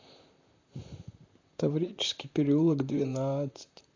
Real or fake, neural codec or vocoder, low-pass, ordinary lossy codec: fake; vocoder, 44.1 kHz, 128 mel bands, Pupu-Vocoder; 7.2 kHz; AAC, 48 kbps